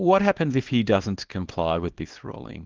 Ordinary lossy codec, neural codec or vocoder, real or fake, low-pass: Opus, 16 kbps; codec, 24 kHz, 0.9 kbps, WavTokenizer, small release; fake; 7.2 kHz